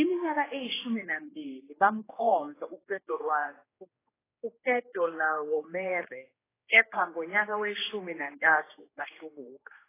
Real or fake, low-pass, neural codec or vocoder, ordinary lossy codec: fake; 3.6 kHz; codec, 16 kHz, 2 kbps, X-Codec, HuBERT features, trained on general audio; AAC, 16 kbps